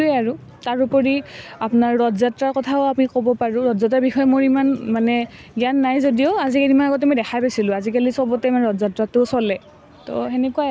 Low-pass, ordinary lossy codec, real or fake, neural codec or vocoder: none; none; real; none